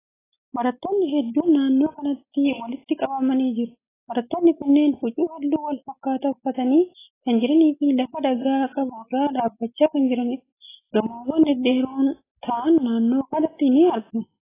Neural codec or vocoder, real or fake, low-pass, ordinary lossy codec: none; real; 3.6 kHz; AAC, 16 kbps